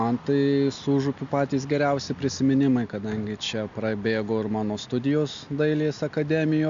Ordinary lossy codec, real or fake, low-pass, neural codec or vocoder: MP3, 64 kbps; real; 7.2 kHz; none